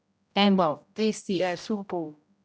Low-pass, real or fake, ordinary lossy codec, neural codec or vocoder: none; fake; none; codec, 16 kHz, 0.5 kbps, X-Codec, HuBERT features, trained on general audio